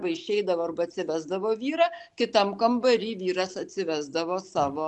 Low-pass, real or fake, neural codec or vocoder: 10.8 kHz; real; none